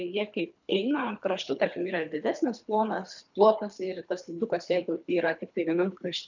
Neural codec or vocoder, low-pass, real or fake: codec, 24 kHz, 3 kbps, HILCodec; 7.2 kHz; fake